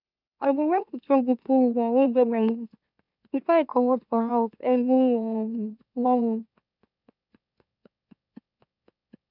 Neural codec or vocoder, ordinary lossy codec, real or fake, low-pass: autoencoder, 44.1 kHz, a latent of 192 numbers a frame, MeloTTS; none; fake; 5.4 kHz